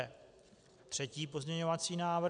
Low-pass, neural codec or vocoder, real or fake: 9.9 kHz; none; real